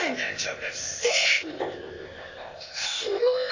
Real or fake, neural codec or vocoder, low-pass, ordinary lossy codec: fake; codec, 16 kHz, 0.8 kbps, ZipCodec; 7.2 kHz; AAC, 48 kbps